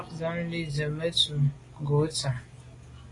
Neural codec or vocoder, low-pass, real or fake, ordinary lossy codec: none; 10.8 kHz; real; AAC, 32 kbps